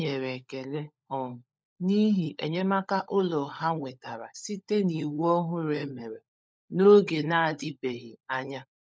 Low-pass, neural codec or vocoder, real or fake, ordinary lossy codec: none; codec, 16 kHz, 16 kbps, FunCodec, trained on LibriTTS, 50 frames a second; fake; none